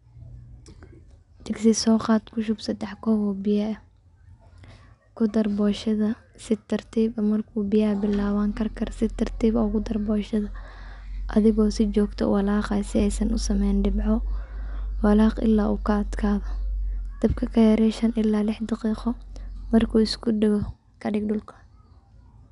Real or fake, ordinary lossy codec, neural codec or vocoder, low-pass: real; none; none; 10.8 kHz